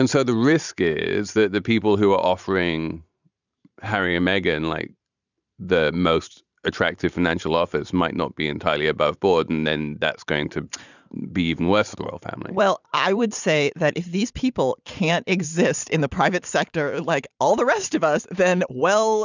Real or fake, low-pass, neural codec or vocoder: fake; 7.2 kHz; vocoder, 44.1 kHz, 128 mel bands every 512 samples, BigVGAN v2